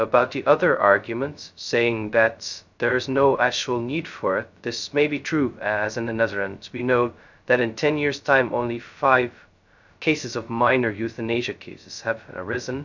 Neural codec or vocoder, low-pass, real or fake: codec, 16 kHz, 0.2 kbps, FocalCodec; 7.2 kHz; fake